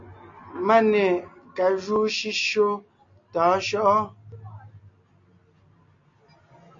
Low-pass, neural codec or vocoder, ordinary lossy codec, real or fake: 7.2 kHz; none; MP3, 48 kbps; real